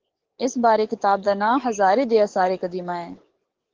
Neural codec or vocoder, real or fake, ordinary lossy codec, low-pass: codec, 44.1 kHz, 7.8 kbps, DAC; fake; Opus, 16 kbps; 7.2 kHz